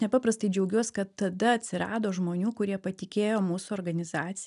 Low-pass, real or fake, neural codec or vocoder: 10.8 kHz; real; none